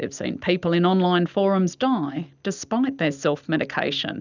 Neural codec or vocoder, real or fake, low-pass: none; real; 7.2 kHz